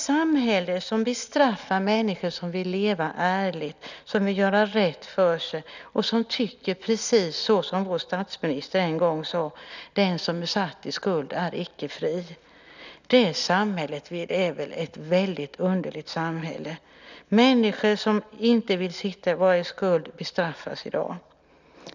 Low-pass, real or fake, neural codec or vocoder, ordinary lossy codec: 7.2 kHz; real; none; none